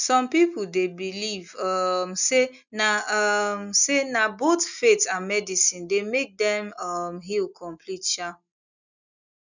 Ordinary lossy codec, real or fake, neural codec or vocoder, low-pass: none; real; none; 7.2 kHz